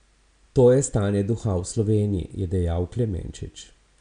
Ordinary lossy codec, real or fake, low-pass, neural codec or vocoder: none; real; 9.9 kHz; none